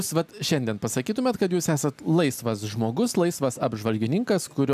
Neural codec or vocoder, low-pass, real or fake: none; 14.4 kHz; real